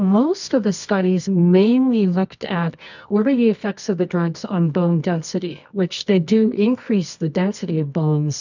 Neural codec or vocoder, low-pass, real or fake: codec, 24 kHz, 0.9 kbps, WavTokenizer, medium music audio release; 7.2 kHz; fake